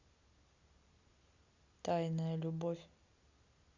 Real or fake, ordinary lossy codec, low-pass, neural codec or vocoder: real; Opus, 64 kbps; 7.2 kHz; none